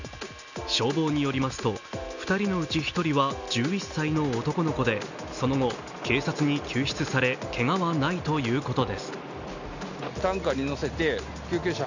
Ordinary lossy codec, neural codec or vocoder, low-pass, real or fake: none; none; 7.2 kHz; real